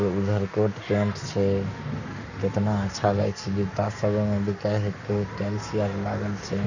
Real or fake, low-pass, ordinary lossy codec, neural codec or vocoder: fake; 7.2 kHz; none; codec, 44.1 kHz, 7.8 kbps, Pupu-Codec